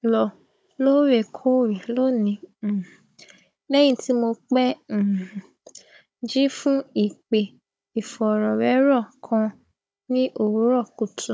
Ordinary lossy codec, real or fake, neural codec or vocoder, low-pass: none; fake; codec, 16 kHz, 16 kbps, FunCodec, trained on Chinese and English, 50 frames a second; none